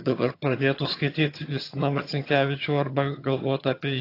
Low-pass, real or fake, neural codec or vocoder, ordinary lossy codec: 5.4 kHz; fake; vocoder, 22.05 kHz, 80 mel bands, HiFi-GAN; AAC, 32 kbps